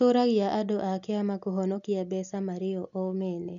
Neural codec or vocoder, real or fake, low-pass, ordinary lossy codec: none; real; 7.2 kHz; none